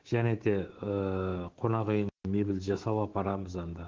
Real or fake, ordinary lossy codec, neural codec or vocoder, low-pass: fake; Opus, 16 kbps; autoencoder, 48 kHz, 128 numbers a frame, DAC-VAE, trained on Japanese speech; 7.2 kHz